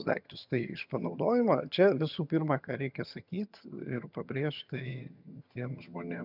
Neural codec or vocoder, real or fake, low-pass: vocoder, 22.05 kHz, 80 mel bands, HiFi-GAN; fake; 5.4 kHz